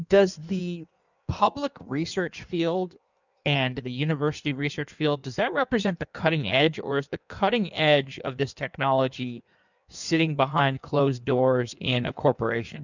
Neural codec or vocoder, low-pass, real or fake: codec, 16 kHz in and 24 kHz out, 1.1 kbps, FireRedTTS-2 codec; 7.2 kHz; fake